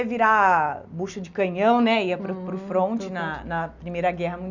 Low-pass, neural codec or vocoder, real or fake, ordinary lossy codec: 7.2 kHz; none; real; none